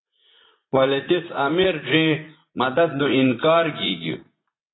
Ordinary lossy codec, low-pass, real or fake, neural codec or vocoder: AAC, 16 kbps; 7.2 kHz; fake; vocoder, 44.1 kHz, 80 mel bands, Vocos